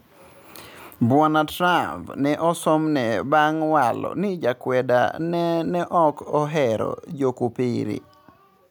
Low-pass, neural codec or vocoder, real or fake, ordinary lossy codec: none; none; real; none